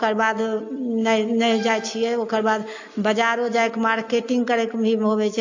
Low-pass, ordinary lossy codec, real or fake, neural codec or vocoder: 7.2 kHz; AAC, 48 kbps; real; none